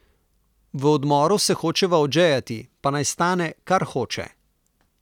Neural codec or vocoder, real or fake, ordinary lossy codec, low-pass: none; real; none; 19.8 kHz